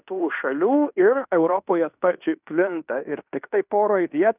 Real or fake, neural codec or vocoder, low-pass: fake; codec, 16 kHz in and 24 kHz out, 0.9 kbps, LongCat-Audio-Codec, fine tuned four codebook decoder; 3.6 kHz